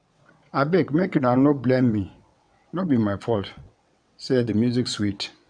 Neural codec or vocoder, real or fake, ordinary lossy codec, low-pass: vocoder, 22.05 kHz, 80 mel bands, WaveNeXt; fake; none; 9.9 kHz